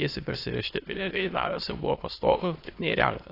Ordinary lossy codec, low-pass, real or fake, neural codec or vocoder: AAC, 32 kbps; 5.4 kHz; fake; autoencoder, 22.05 kHz, a latent of 192 numbers a frame, VITS, trained on many speakers